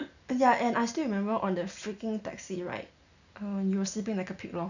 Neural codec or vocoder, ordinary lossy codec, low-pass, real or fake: none; none; 7.2 kHz; real